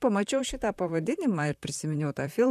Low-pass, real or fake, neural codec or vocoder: 14.4 kHz; fake; vocoder, 44.1 kHz, 128 mel bands, Pupu-Vocoder